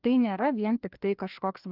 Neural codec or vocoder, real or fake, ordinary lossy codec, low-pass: codec, 16 kHz, 2 kbps, FreqCodec, larger model; fake; Opus, 32 kbps; 5.4 kHz